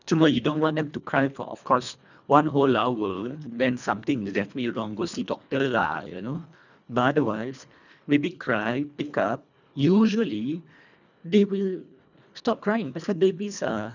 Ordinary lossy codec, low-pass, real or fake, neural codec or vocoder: none; 7.2 kHz; fake; codec, 24 kHz, 1.5 kbps, HILCodec